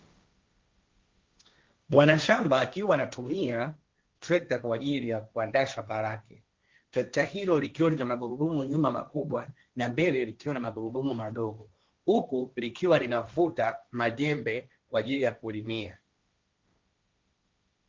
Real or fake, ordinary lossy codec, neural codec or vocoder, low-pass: fake; Opus, 24 kbps; codec, 16 kHz, 1.1 kbps, Voila-Tokenizer; 7.2 kHz